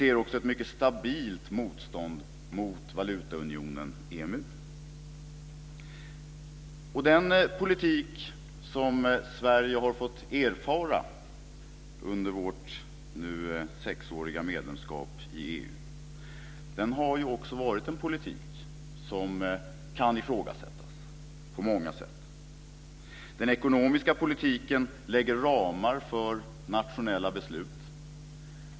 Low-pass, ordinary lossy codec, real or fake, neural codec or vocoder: none; none; real; none